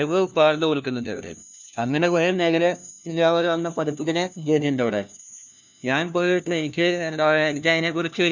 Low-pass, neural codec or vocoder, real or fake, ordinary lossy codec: 7.2 kHz; codec, 16 kHz, 1 kbps, FunCodec, trained on LibriTTS, 50 frames a second; fake; none